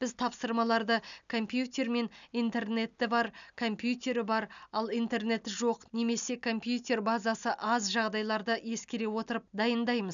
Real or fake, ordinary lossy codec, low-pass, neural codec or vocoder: real; none; 7.2 kHz; none